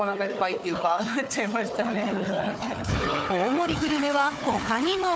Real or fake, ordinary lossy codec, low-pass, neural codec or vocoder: fake; none; none; codec, 16 kHz, 4 kbps, FunCodec, trained on Chinese and English, 50 frames a second